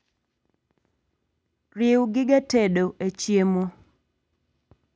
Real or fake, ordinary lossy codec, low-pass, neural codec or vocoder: real; none; none; none